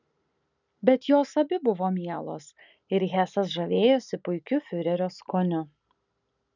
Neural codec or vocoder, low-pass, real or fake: none; 7.2 kHz; real